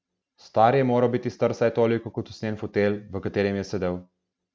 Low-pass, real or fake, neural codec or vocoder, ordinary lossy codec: none; real; none; none